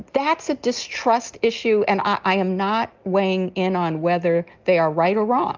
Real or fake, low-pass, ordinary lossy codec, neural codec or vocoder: real; 7.2 kHz; Opus, 32 kbps; none